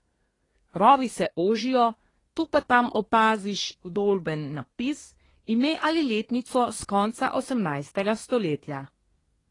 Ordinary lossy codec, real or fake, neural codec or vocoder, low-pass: AAC, 32 kbps; fake; codec, 24 kHz, 1 kbps, SNAC; 10.8 kHz